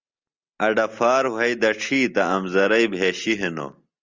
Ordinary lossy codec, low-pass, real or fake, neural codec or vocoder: Opus, 32 kbps; 7.2 kHz; real; none